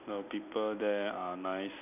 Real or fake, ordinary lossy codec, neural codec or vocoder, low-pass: real; none; none; 3.6 kHz